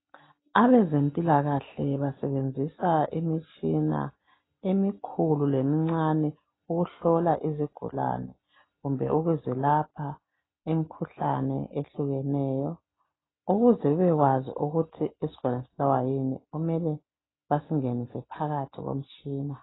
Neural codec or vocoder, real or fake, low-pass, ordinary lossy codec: none; real; 7.2 kHz; AAC, 16 kbps